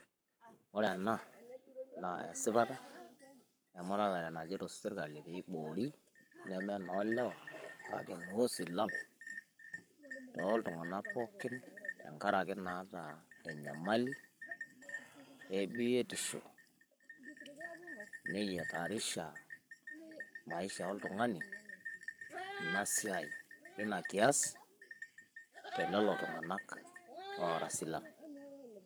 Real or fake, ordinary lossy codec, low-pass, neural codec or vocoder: fake; none; none; codec, 44.1 kHz, 7.8 kbps, Pupu-Codec